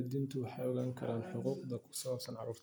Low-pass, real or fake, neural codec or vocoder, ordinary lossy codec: none; real; none; none